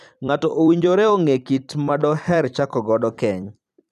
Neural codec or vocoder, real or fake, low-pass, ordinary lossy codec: vocoder, 44.1 kHz, 128 mel bands every 256 samples, BigVGAN v2; fake; 14.4 kHz; none